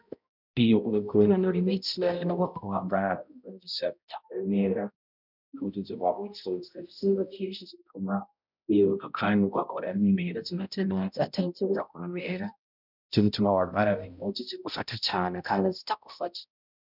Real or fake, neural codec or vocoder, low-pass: fake; codec, 16 kHz, 0.5 kbps, X-Codec, HuBERT features, trained on balanced general audio; 5.4 kHz